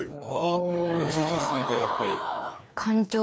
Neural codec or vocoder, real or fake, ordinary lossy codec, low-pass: codec, 16 kHz, 2 kbps, FreqCodec, larger model; fake; none; none